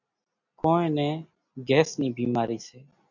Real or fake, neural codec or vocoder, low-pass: real; none; 7.2 kHz